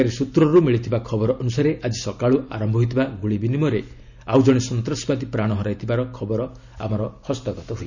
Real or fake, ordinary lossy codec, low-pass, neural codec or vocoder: real; none; 7.2 kHz; none